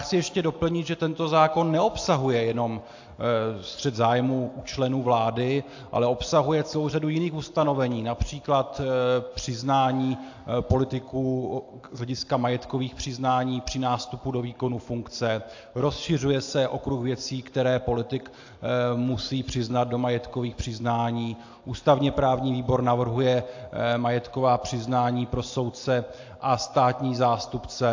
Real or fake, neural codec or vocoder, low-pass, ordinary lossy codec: real; none; 7.2 kHz; AAC, 48 kbps